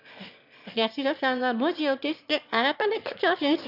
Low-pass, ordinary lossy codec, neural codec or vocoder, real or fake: 5.4 kHz; none; autoencoder, 22.05 kHz, a latent of 192 numbers a frame, VITS, trained on one speaker; fake